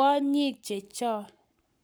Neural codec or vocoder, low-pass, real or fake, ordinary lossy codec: codec, 44.1 kHz, 7.8 kbps, Pupu-Codec; none; fake; none